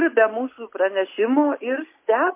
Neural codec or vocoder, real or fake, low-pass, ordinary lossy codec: none; real; 3.6 kHz; MP3, 24 kbps